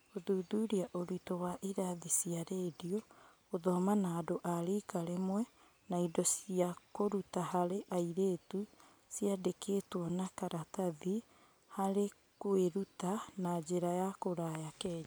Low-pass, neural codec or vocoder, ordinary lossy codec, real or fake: none; none; none; real